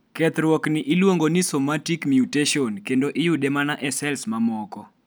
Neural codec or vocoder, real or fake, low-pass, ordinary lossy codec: none; real; none; none